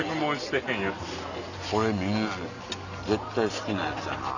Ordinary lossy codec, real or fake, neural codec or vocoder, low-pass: none; real; none; 7.2 kHz